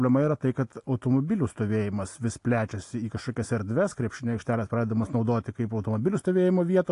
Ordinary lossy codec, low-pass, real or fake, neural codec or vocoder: AAC, 48 kbps; 10.8 kHz; real; none